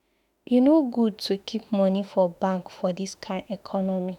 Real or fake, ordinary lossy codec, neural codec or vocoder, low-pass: fake; none; autoencoder, 48 kHz, 32 numbers a frame, DAC-VAE, trained on Japanese speech; 19.8 kHz